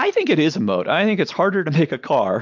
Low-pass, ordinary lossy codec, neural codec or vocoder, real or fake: 7.2 kHz; MP3, 64 kbps; none; real